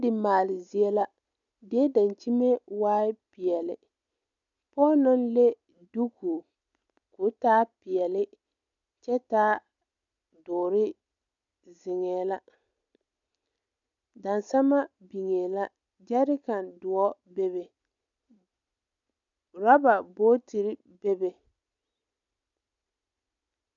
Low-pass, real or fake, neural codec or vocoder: 7.2 kHz; real; none